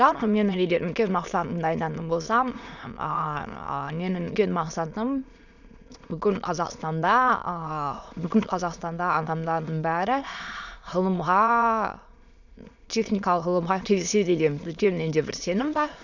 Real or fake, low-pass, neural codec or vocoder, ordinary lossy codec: fake; 7.2 kHz; autoencoder, 22.05 kHz, a latent of 192 numbers a frame, VITS, trained on many speakers; none